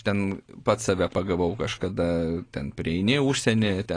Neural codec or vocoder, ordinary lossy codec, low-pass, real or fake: none; AAC, 32 kbps; 9.9 kHz; real